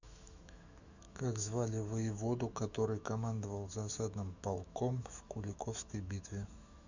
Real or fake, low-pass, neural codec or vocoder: fake; 7.2 kHz; autoencoder, 48 kHz, 128 numbers a frame, DAC-VAE, trained on Japanese speech